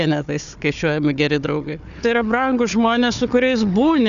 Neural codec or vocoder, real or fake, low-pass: codec, 16 kHz, 4 kbps, FunCodec, trained on Chinese and English, 50 frames a second; fake; 7.2 kHz